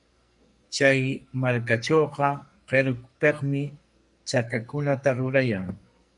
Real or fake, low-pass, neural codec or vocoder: fake; 10.8 kHz; codec, 44.1 kHz, 2.6 kbps, SNAC